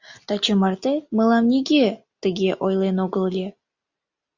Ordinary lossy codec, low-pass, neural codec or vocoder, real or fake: Opus, 64 kbps; 7.2 kHz; none; real